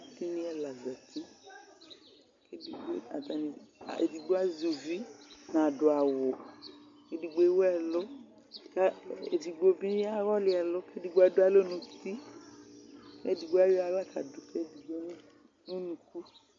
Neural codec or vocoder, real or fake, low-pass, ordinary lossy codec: none; real; 7.2 kHz; MP3, 48 kbps